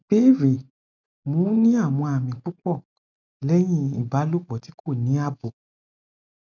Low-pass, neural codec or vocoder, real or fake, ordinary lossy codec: 7.2 kHz; none; real; none